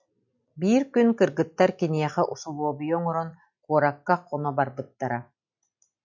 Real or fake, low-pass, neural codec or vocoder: real; 7.2 kHz; none